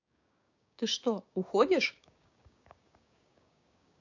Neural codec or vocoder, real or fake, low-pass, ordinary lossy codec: codec, 16 kHz, 6 kbps, DAC; fake; 7.2 kHz; AAC, 48 kbps